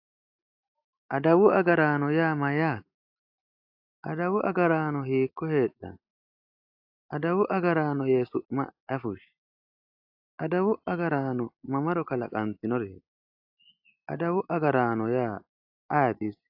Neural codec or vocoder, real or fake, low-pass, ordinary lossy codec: none; real; 5.4 kHz; AAC, 48 kbps